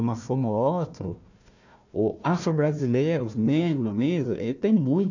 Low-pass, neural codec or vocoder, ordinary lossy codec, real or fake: 7.2 kHz; codec, 16 kHz, 1 kbps, FunCodec, trained on Chinese and English, 50 frames a second; none; fake